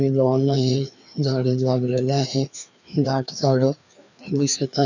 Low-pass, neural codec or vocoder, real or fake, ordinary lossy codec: 7.2 kHz; codec, 16 kHz, 2 kbps, FreqCodec, larger model; fake; none